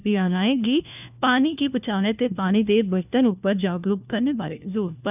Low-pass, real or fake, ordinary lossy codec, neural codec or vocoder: 3.6 kHz; fake; none; codec, 16 kHz, 1 kbps, FunCodec, trained on LibriTTS, 50 frames a second